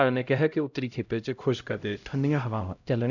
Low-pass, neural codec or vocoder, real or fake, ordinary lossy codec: 7.2 kHz; codec, 16 kHz, 0.5 kbps, X-Codec, HuBERT features, trained on LibriSpeech; fake; none